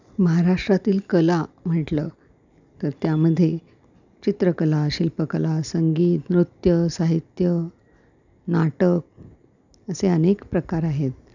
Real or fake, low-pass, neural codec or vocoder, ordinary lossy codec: real; 7.2 kHz; none; none